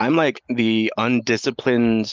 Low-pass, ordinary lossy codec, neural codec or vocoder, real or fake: 7.2 kHz; Opus, 24 kbps; none; real